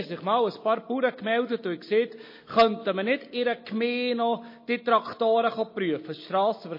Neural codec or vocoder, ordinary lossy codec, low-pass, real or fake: none; MP3, 24 kbps; 5.4 kHz; real